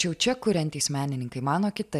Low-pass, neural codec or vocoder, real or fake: 14.4 kHz; none; real